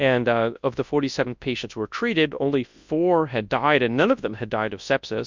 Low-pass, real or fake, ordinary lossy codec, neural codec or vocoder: 7.2 kHz; fake; MP3, 64 kbps; codec, 24 kHz, 0.9 kbps, WavTokenizer, large speech release